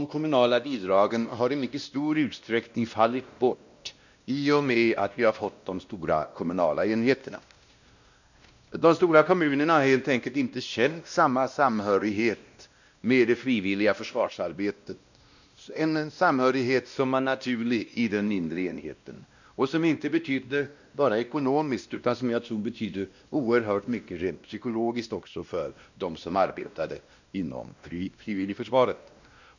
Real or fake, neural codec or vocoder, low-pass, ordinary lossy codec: fake; codec, 16 kHz, 1 kbps, X-Codec, WavLM features, trained on Multilingual LibriSpeech; 7.2 kHz; none